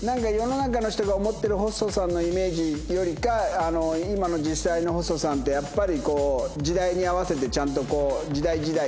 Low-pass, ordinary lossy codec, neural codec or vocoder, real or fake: none; none; none; real